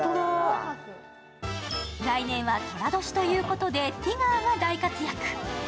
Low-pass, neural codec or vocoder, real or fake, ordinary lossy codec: none; none; real; none